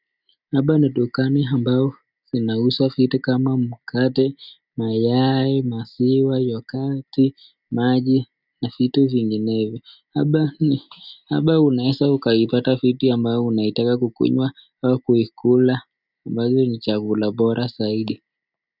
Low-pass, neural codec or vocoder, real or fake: 5.4 kHz; none; real